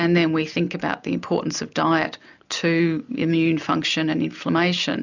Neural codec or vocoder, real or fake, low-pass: none; real; 7.2 kHz